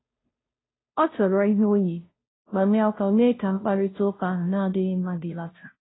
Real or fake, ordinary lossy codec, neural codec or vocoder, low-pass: fake; AAC, 16 kbps; codec, 16 kHz, 0.5 kbps, FunCodec, trained on Chinese and English, 25 frames a second; 7.2 kHz